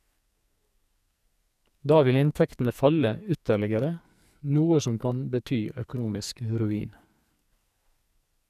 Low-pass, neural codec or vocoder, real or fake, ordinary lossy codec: 14.4 kHz; codec, 44.1 kHz, 2.6 kbps, SNAC; fake; none